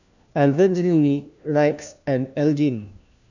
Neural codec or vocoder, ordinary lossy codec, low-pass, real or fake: codec, 16 kHz, 1 kbps, FunCodec, trained on LibriTTS, 50 frames a second; none; 7.2 kHz; fake